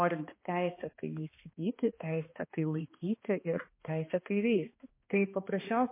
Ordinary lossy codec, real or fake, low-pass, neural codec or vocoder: MP3, 24 kbps; fake; 3.6 kHz; codec, 16 kHz, 2 kbps, X-Codec, HuBERT features, trained on balanced general audio